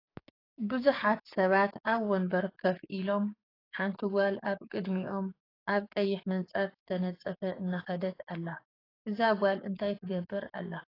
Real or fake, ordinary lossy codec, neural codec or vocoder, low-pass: fake; AAC, 24 kbps; codec, 44.1 kHz, 7.8 kbps, DAC; 5.4 kHz